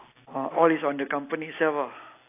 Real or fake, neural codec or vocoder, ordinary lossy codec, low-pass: real; none; AAC, 24 kbps; 3.6 kHz